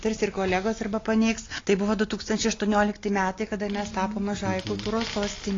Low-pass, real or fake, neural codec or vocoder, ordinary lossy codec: 7.2 kHz; real; none; AAC, 32 kbps